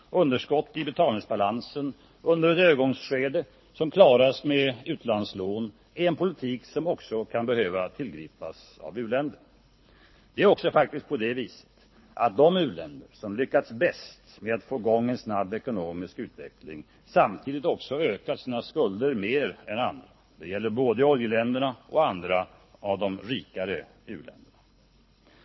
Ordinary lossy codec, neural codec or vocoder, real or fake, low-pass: MP3, 24 kbps; codec, 24 kHz, 6 kbps, HILCodec; fake; 7.2 kHz